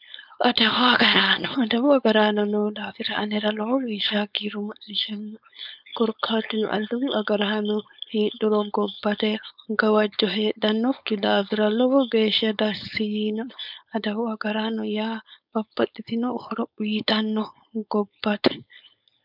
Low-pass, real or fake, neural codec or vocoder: 5.4 kHz; fake; codec, 16 kHz, 4.8 kbps, FACodec